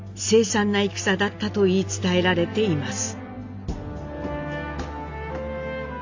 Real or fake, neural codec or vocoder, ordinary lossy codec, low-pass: real; none; AAC, 48 kbps; 7.2 kHz